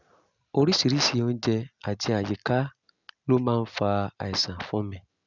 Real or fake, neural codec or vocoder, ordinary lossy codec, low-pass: real; none; none; 7.2 kHz